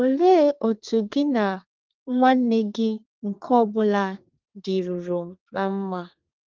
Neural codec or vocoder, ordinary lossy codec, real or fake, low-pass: codec, 32 kHz, 1.9 kbps, SNAC; Opus, 32 kbps; fake; 7.2 kHz